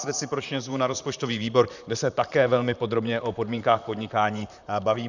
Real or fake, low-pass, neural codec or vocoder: fake; 7.2 kHz; vocoder, 44.1 kHz, 128 mel bands, Pupu-Vocoder